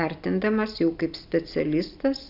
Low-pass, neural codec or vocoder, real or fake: 5.4 kHz; none; real